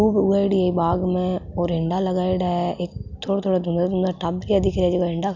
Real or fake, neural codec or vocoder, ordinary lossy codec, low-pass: real; none; none; 7.2 kHz